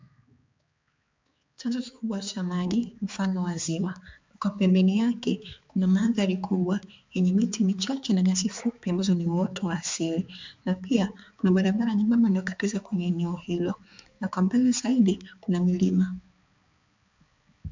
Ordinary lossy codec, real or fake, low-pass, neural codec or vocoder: MP3, 64 kbps; fake; 7.2 kHz; codec, 16 kHz, 4 kbps, X-Codec, HuBERT features, trained on balanced general audio